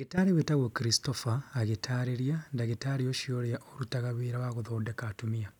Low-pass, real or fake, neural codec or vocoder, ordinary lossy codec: 19.8 kHz; real; none; none